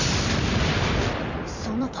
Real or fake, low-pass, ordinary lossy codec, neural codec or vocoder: fake; 7.2 kHz; none; vocoder, 44.1 kHz, 128 mel bands every 256 samples, BigVGAN v2